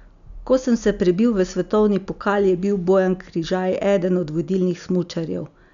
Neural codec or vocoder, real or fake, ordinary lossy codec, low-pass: none; real; none; 7.2 kHz